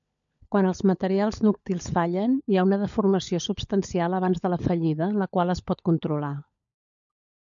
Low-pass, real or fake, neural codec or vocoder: 7.2 kHz; fake; codec, 16 kHz, 16 kbps, FunCodec, trained on LibriTTS, 50 frames a second